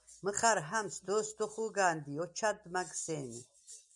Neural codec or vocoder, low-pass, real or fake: none; 10.8 kHz; real